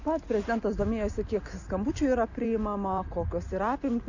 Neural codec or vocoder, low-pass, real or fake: none; 7.2 kHz; real